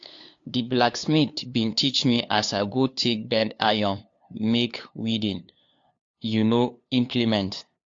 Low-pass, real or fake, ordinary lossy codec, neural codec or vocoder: 7.2 kHz; fake; AAC, 48 kbps; codec, 16 kHz, 2 kbps, FunCodec, trained on LibriTTS, 25 frames a second